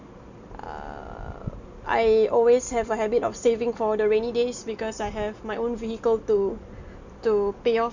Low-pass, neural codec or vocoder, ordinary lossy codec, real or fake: 7.2 kHz; none; none; real